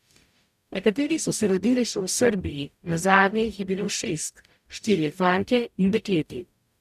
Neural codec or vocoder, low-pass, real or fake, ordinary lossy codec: codec, 44.1 kHz, 0.9 kbps, DAC; 14.4 kHz; fake; none